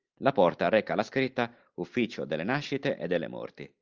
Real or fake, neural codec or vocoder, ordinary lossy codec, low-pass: real; none; Opus, 24 kbps; 7.2 kHz